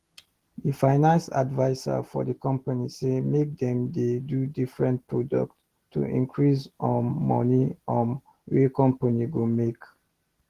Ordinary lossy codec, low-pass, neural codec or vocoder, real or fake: Opus, 16 kbps; 14.4 kHz; none; real